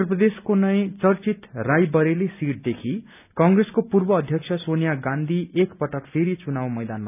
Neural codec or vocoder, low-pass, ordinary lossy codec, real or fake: none; 3.6 kHz; none; real